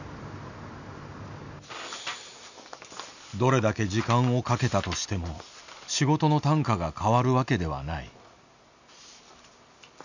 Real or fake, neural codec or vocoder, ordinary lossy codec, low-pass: real; none; none; 7.2 kHz